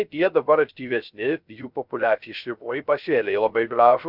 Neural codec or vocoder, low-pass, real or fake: codec, 16 kHz, 0.3 kbps, FocalCodec; 5.4 kHz; fake